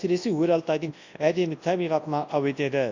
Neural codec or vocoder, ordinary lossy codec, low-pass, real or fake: codec, 24 kHz, 0.9 kbps, WavTokenizer, large speech release; AAC, 48 kbps; 7.2 kHz; fake